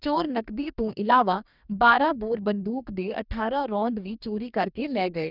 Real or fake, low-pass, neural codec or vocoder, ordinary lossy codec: fake; 5.4 kHz; codec, 44.1 kHz, 2.6 kbps, DAC; none